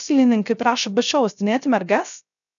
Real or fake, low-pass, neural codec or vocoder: fake; 7.2 kHz; codec, 16 kHz, 0.3 kbps, FocalCodec